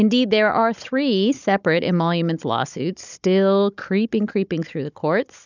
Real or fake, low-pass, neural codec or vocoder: fake; 7.2 kHz; codec, 16 kHz, 16 kbps, FunCodec, trained on Chinese and English, 50 frames a second